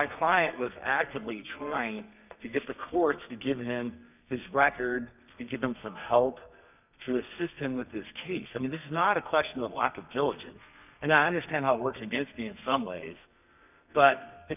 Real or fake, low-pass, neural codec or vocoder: fake; 3.6 kHz; codec, 32 kHz, 1.9 kbps, SNAC